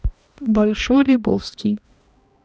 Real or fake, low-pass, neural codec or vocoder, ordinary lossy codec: fake; none; codec, 16 kHz, 1 kbps, X-Codec, HuBERT features, trained on balanced general audio; none